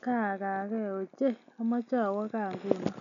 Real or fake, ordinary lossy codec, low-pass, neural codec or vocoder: real; none; 7.2 kHz; none